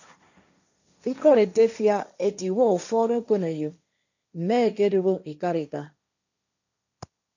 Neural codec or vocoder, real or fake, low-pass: codec, 16 kHz, 1.1 kbps, Voila-Tokenizer; fake; 7.2 kHz